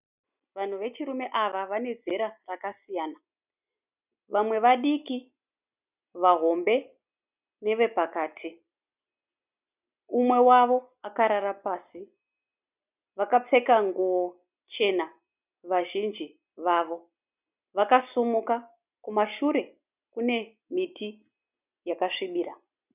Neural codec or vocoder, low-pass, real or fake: none; 3.6 kHz; real